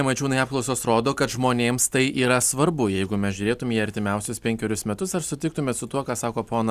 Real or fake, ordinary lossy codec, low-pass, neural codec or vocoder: real; Opus, 64 kbps; 14.4 kHz; none